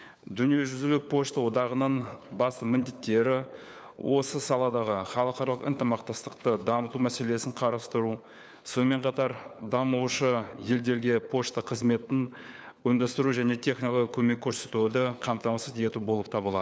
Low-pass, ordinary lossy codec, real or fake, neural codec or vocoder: none; none; fake; codec, 16 kHz, 4 kbps, FunCodec, trained on LibriTTS, 50 frames a second